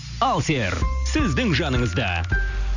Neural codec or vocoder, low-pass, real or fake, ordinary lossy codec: none; 7.2 kHz; real; none